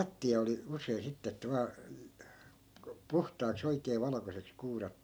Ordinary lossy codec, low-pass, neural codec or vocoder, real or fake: none; none; none; real